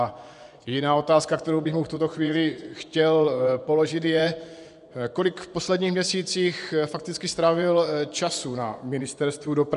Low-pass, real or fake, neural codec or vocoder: 10.8 kHz; fake; vocoder, 24 kHz, 100 mel bands, Vocos